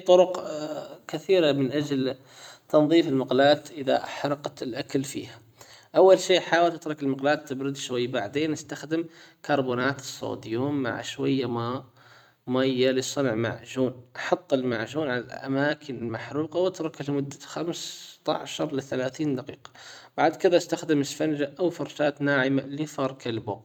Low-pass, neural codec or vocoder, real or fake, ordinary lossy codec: 19.8 kHz; vocoder, 44.1 kHz, 128 mel bands every 256 samples, BigVGAN v2; fake; none